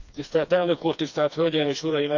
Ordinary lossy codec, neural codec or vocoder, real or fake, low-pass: none; codec, 16 kHz, 2 kbps, FreqCodec, smaller model; fake; 7.2 kHz